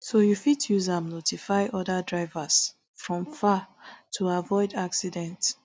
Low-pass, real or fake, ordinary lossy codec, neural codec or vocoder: none; real; none; none